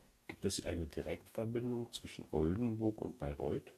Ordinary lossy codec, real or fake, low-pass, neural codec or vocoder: MP3, 96 kbps; fake; 14.4 kHz; codec, 44.1 kHz, 2.6 kbps, DAC